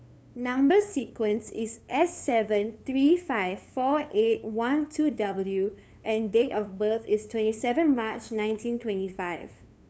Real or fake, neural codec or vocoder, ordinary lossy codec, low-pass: fake; codec, 16 kHz, 2 kbps, FunCodec, trained on LibriTTS, 25 frames a second; none; none